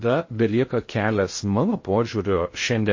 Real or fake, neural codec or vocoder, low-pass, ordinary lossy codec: fake; codec, 16 kHz in and 24 kHz out, 0.6 kbps, FocalCodec, streaming, 2048 codes; 7.2 kHz; MP3, 32 kbps